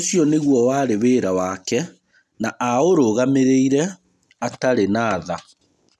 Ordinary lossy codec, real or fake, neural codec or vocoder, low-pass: none; real; none; none